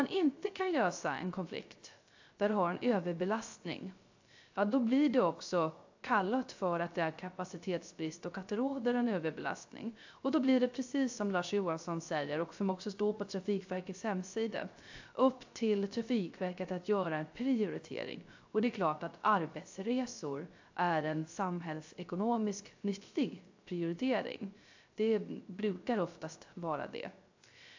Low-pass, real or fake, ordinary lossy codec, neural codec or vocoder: 7.2 kHz; fake; AAC, 48 kbps; codec, 16 kHz, 0.3 kbps, FocalCodec